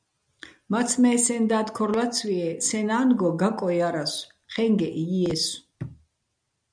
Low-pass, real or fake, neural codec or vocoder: 9.9 kHz; real; none